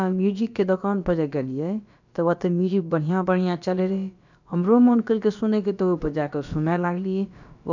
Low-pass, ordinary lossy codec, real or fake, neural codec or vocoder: 7.2 kHz; none; fake; codec, 16 kHz, about 1 kbps, DyCAST, with the encoder's durations